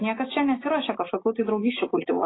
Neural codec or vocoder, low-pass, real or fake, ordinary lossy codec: none; 7.2 kHz; real; AAC, 16 kbps